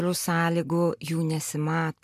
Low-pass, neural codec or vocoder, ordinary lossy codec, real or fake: 14.4 kHz; none; MP3, 96 kbps; real